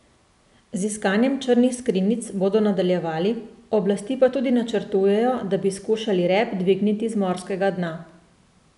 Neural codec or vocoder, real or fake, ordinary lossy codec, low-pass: none; real; none; 10.8 kHz